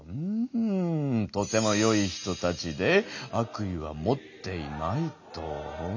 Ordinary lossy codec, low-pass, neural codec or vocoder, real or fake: none; 7.2 kHz; none; real